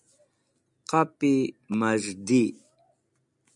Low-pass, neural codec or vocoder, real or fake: 10.8 kHz; none; real